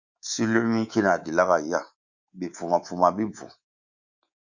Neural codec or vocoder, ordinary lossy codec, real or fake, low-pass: codec, 24 kHz, 3.1 kbps, DualCodec; Opus, 64 kbps; fake; 7.2 kHz